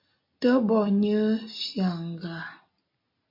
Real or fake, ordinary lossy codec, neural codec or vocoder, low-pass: real; MP3, 32 kbps; none; 5.4 kHz